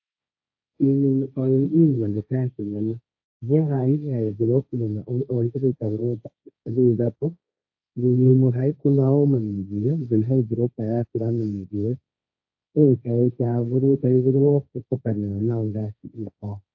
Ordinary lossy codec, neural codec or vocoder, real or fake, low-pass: AAC, 48 kbps; codec, 16 kHz, 1.1 kbps, Voila-Tokenizer; fake; 7.2 kHz